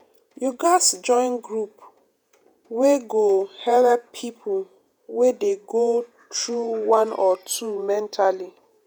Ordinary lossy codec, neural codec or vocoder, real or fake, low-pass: none; vocoder, 48 kHz, 128 mel bands, Vocos; fake; none